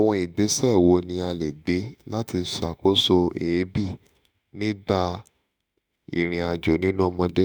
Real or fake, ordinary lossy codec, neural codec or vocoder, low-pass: fake; none; autoencoder, 48 kHz, 32 numbers a frame, DAC-VAE, trained on Japanese speech; none